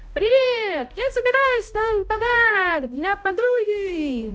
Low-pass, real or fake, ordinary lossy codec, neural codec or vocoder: none; fake; none; codec, 16 kHz, 0.5 kbps, X-Codec, HuBERT features, trained on balanced general audio